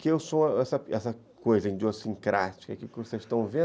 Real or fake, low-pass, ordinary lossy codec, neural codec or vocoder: real; none; none; none